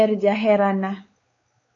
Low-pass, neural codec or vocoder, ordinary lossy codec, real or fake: 7.2 kHz; codec, 16 kHz, 4 kbps, X-Codec, WavLM features, trained on Multilingual LibriSpeech; AAC, 32 kbps; fake